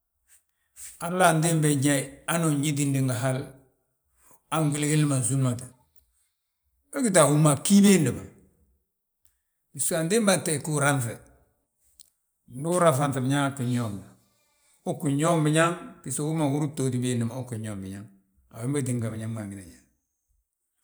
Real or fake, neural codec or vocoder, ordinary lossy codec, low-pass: fake; vocoder, 44.1 kHz, 128 mel bands every 512 samples, BigVGAN v2; none; none